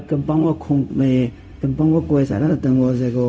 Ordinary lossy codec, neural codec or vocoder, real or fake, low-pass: none; codec, 16 kHz, 0.4 kbps, LongCat-Audio-Codec; fake; none